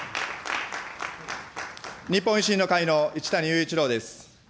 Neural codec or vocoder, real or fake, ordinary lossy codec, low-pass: none; real; none; none